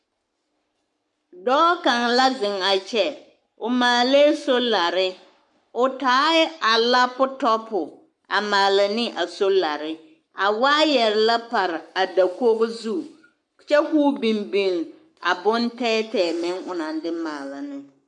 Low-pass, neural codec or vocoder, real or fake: 10.8 kHz; codec, 44.1 kHz, 7.8 kbps, Pupu-Codec; fake